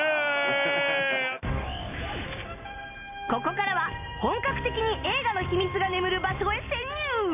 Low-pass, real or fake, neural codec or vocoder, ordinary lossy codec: 3.6 kHz; real; none; none